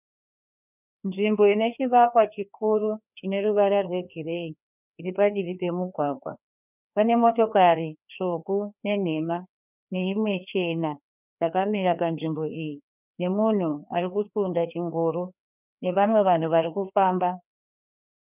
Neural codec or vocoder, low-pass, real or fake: codec, 16 kHz, 2 kbps, FreqCodec, larger model; 3.6 kHz; fake